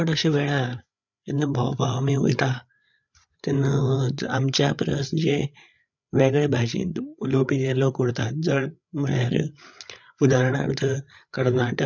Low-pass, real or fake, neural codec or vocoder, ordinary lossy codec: 7.2 kHz; fake; codec, 16 kHz, 8 kbps, FreqCodec, larger model; none